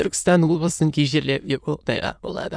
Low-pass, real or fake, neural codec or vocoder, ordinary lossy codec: 9.9 kHz; fake; autoencoder, 22.05 kHz, a latent of 192 numbers a frame, VITS, trained on many speakers; none